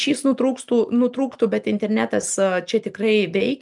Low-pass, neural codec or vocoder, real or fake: 10.8 kHz; none; real